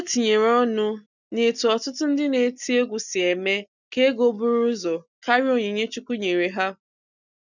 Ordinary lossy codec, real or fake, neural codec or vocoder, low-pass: none; real; none; 7.2 kHz